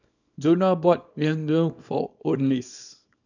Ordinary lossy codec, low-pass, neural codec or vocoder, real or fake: none; 7.2 kHz; codec, 24 kHz, 0.9 kbps, WavTokenizer, small release; fake